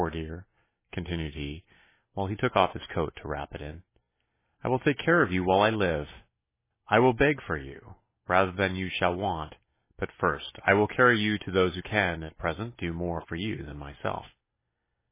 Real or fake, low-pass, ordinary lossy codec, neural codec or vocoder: real; 3.6 kHz; MP3, 16 kbps; none